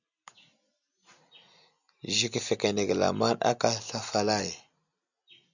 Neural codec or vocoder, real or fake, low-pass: none; real; 7.2 kHz